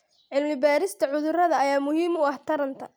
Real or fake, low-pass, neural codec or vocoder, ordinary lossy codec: real; none; none; none